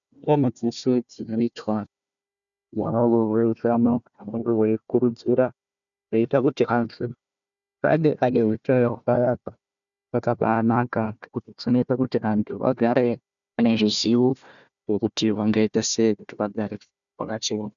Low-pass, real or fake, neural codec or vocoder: 7.2 kHz; fake; codec, 16 kHz, 1 kbps, FunCodec, trained on Chinese and English, 50 frames a second